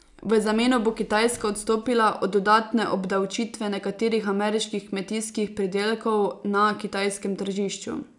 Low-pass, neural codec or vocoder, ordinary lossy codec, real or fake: 10.8 kHz; none; none; real